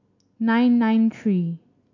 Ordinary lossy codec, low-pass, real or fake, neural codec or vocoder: AAC, 48 kbps; 7.2 kHz; real; none